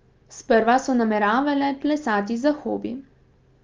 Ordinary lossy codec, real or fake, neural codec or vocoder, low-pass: Opus, 32 kbps; real; none; 7.2 kHz